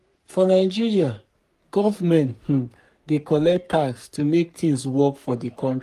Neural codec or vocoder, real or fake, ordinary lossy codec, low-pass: codec, 44.1 kHz, 3.4 kbps, Pupu-Codec; fake; Opus, 32 kbps; 14.4 kHz